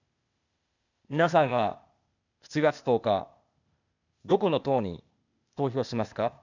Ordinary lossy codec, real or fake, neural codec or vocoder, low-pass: none; fake; codec, 16 kHz, 0.8 kbps, ZipCodec; 7.2 kHz